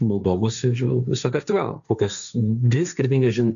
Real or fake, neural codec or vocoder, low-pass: fake; codec, 16 kHz, 1.1 kbps, Voila-Tokenizer; 7.2 kHz